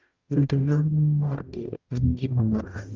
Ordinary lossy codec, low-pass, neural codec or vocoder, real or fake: Opus, 24 kbps; 7.2 kHz; codec, 44.1 kHz, 0.9 kbps, DAC; fake